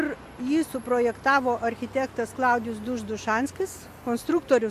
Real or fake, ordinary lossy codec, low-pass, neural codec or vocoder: real; MP3, 64 kbps; 14.4 kHz; none